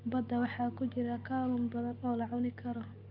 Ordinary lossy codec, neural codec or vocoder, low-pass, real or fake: Opus, 32 kbps; none; 5.4 kHz; real